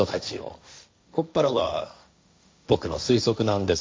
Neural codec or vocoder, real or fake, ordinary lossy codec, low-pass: codec, 16 kHz, 1.1 kbps, Voila-Tokenizer; fake; none; none